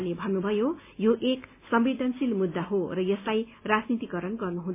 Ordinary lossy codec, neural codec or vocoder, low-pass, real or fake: MP3, 24 kbps; none; 3.6 kHz; real